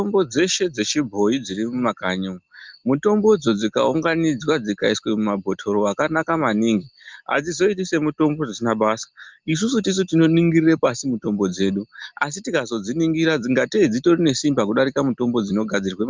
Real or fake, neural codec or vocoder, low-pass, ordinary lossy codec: real; none; 7.2 kHz; Opus, 24 kbps